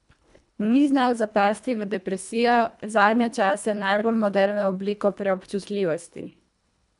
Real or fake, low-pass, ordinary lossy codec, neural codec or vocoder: fake; 10.8 kHz; none; codec, 24 kHz, 1.5 kbps, HILCodec